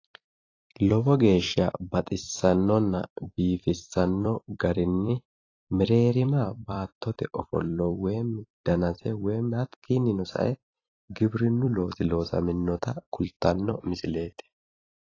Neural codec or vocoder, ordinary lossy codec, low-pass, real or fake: vocoder, 44.1 kHz, 128 mel bands every 256 samples, BigVGAN v2; AAC, 32 kbps; 7.2 kHz; fake